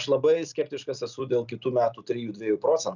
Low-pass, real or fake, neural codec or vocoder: 7.2 kHz; real; none